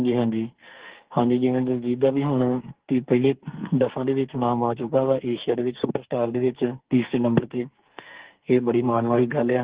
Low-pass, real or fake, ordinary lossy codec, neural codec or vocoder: 3.6 kHz; fake; Opus, 16 kbps; codec, 44.1 kHz, 2.6 kbps, SNAC